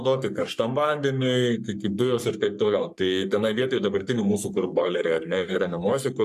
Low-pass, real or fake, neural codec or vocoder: 14.4 kHz; fake; codec, 44.1 kHz, 3.4 kbps, Pupu-Codec